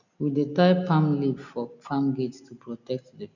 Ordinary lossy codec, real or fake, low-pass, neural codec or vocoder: none; real; 7.2 kHz; none